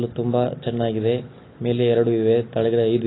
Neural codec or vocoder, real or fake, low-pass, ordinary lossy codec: none; real; 7.2 kHz; AAC, 16 kbps